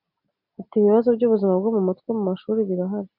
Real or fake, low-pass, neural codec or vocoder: real; 5.4 kHz; none